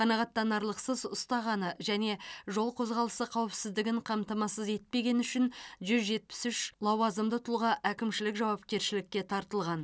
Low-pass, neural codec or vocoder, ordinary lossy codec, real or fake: none; none; none; real